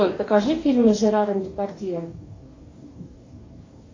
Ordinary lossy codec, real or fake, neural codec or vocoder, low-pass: AAC, 32 kbps; fake; codec, 44.1 kHz, 2.6 kbps, DAC; 7.2 kHz